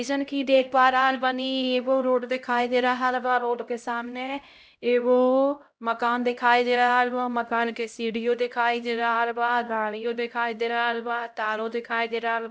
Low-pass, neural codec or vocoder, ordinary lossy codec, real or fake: none; codec, 16 kHz, 0.5 kbps, X-Codec, HuBERT features, trained on LibriSpeech; none; fake